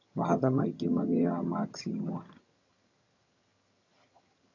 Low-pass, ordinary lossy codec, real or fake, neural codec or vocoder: 7.2 kHz; MP3, 64 kbps; fake; vocoder, 22.05 kHz, 80 mel bands, HiFi-GAN